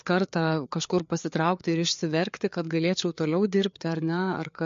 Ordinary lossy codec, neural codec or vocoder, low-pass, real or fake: MP3, 48 kbps; codec, 16 kHz, 4 kbps, FreqCodec, larger model; 7.2 kHz; fake